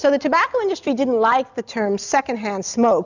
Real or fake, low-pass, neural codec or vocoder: real; 7.2 kHz; none